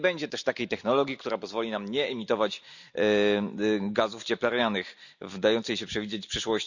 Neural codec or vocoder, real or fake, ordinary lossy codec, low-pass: none; real; none; 7.2 kHz